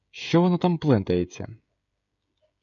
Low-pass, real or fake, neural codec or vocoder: 7.2 kHz; fake; codec, 16 kHz, 16 kbps, FreqCodec, smaller model